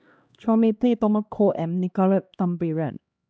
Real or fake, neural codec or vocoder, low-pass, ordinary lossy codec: fake; codec, 16 kHz, 1 kbps, X-Codec, HuBERT features, trained on LibriSpeech; none; none